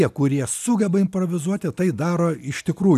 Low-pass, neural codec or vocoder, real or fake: 14.4 kHz; none; real